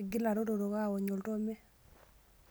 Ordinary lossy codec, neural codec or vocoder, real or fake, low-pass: none; none; real; none